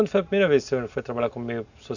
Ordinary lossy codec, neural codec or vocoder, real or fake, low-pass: none; none; real; 7.2 kHz